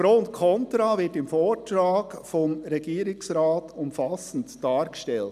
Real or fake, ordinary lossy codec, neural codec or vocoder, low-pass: real; none; none; 14.4 kHz